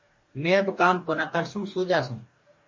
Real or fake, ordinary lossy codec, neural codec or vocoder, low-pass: fake; MP3, 32 kbps; codec, 44.1 kHz, 2.6 kbps, DAC; 7.2 kHz